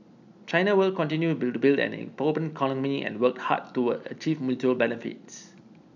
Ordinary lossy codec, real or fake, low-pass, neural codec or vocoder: none; real; 7.2 kHz; none